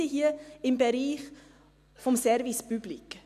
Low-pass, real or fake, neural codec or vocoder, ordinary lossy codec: 14.4 kHz; real; none; none